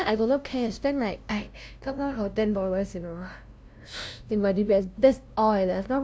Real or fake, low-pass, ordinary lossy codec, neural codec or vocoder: fake; none; none; codec, 16 kHz, 0.5 kbps, FunCodec, trained on LibriTTS, 25 frames a second